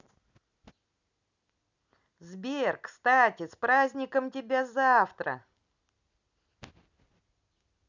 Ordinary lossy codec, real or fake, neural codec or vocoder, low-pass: none; real; none; 7.2 kHz